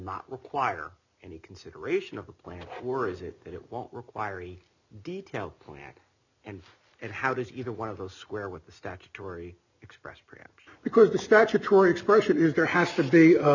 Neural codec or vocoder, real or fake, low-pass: none; real; 7.2 kHz